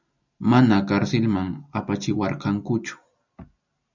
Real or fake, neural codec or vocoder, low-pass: real; none; 7.2 kHz